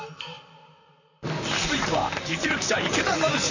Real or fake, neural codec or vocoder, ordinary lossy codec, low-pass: fake; vocoder, 44.1 kHz, 128 mel bands, Pupu-Vocoder; none; 7.2 kHz